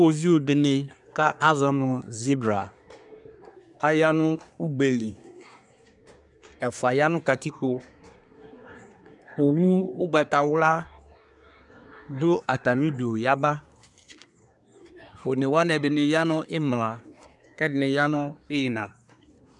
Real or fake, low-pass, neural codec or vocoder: fake; 10.8 kHz; codec, 24 kHz, 1 kbps, SNAC